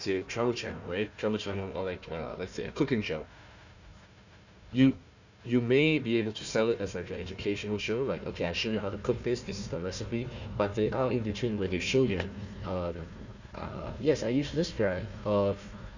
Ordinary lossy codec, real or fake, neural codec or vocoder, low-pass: none; fake; codec, 16 kHz, 1 kbps, FunCodec, trained on Chinese and English, 50 frames a second; 7.2 kHz